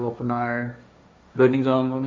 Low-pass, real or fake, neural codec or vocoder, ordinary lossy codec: none; fake; codec, 16 kHz, 1.1 kbps, Voila-Tokenizer; none